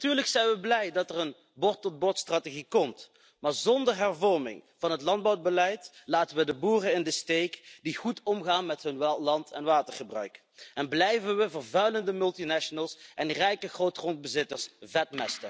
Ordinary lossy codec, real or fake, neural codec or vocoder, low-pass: none; real; none; none